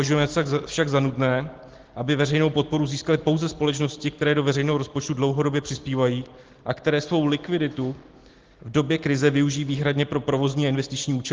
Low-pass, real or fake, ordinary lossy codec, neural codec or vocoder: 7.2 kHz; real; Opus, 16 kbps; none